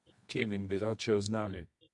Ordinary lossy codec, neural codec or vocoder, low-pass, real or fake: MP3, 64 kbps; codec, 24 kHz, 0.9 kbps, WavTokenizer, medium music audio release; 10.8 kHz; fake